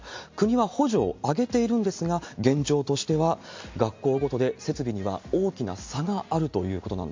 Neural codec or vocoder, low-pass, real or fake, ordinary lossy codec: none; 7.2 kHz; real; MP3, 48 kbps